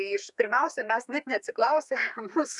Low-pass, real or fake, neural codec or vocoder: 10.8 kHz; fake; codec, 32 kHz, 1.9 kbps, SNAC